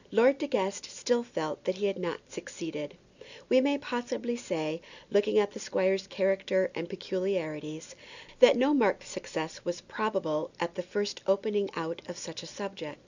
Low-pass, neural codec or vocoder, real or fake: 7.2 kHz; none; real